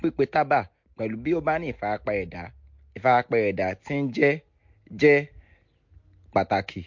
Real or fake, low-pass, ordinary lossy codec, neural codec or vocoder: real; 7.2 kHz; MP3, 48 kbps; none